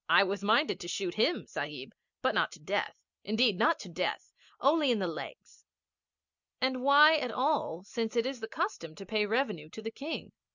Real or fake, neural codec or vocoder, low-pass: real; none; 7.2 kHz